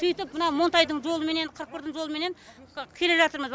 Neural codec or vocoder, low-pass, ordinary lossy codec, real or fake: none; none; none; real